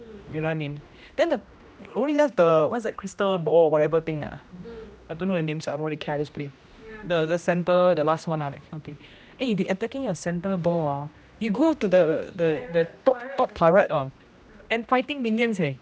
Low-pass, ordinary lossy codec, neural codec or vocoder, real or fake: none; none; codec, 16 kHz, 1 kbps, X-Codec, HuBERT features, trained on general audio; fake